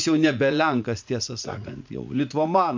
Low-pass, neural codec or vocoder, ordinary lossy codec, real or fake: 7.2 kHz; vocoder, 44.1 kHz, 80 mel bands, Vocos; MP3, 64 kbps; fake